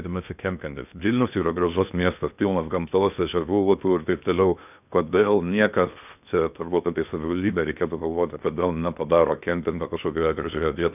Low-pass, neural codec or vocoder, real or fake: 3.6 kHz; codec, 16 kHz in and 24 kHz out, 0.6 kbps, FocalCodec, streaming, 2048 codes; fake